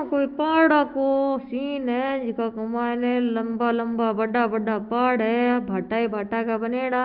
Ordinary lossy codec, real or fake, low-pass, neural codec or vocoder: Opus, 32 kbps; fake; 5.4 kHz; autoencoder, 48 kHz, 128 numbers a frame, DAC-VAE, trained on Japanese speech